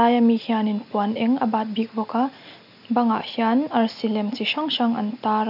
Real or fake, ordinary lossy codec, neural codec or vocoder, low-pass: real; none; none; 5.4 kHz